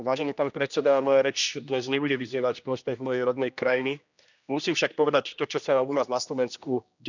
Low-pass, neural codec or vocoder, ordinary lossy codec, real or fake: 7.2 kHz; codec, 16 kHz, 1 kbps, X-Codec, HuBERT features, trained on general audio; none; fake